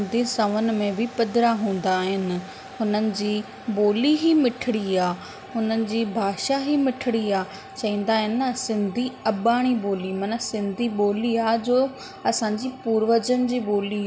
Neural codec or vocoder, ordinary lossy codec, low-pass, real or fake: none; none; none; real